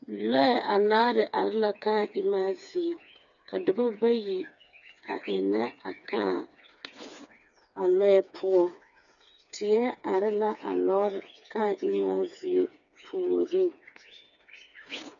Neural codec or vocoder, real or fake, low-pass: codec, 16 kHz, 4 kbps, FreqCodec, smaller model; fake; 7.2 kHz